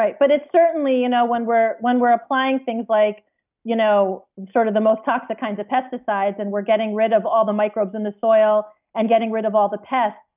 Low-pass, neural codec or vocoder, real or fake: 3.6 kHz; none; real